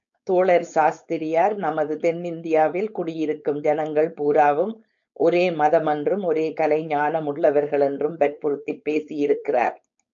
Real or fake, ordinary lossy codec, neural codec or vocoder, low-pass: fake; MP3, 64 kbps; codec, 16 kHz, 4.8 kbps, FACodec; 7.2 kHz